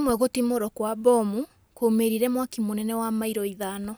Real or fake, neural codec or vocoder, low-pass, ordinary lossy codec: real; none; none; none